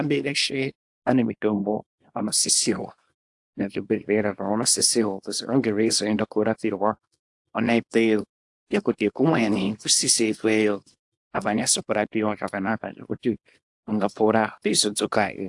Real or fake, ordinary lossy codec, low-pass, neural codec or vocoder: fake; AAC, 64 kbps; 10.8 kHz; codec, 24 kHz, 0.9 kbps, WavTokenizer, small release